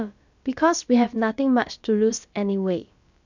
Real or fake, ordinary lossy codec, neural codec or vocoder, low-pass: fake; none; codec, 16 kHz, about 1 kbps, DyCAST, with the encoder's durations; 7.2 kHz